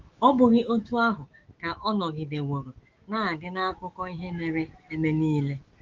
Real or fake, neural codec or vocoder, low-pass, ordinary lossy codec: fake; autoencoder, 48 kHz, 128 numbers a frame, DAC-VAE, trained on Japanese speech; 7.2 kHz; Opus, 32 kbps